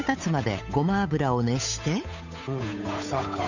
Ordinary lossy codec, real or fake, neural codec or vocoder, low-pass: none; fake; codec, 16 kHz, 8 kbps, FunCodec, trained on Chinese and English, 25 frames a second; 7.2 kHz